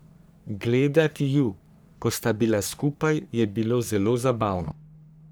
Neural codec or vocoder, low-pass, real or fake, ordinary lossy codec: codec, 44.1 kHz, 3.4 kbps, Pupu-Codec; none; fake; none